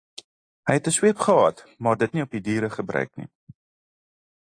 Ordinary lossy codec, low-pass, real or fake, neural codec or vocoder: AAC, 48 kbps; 9.9 kHz; real; none